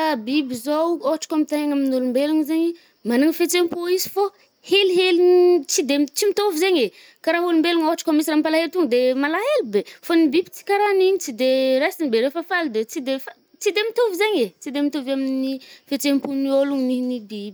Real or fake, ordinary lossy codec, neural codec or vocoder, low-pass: real; none; none; none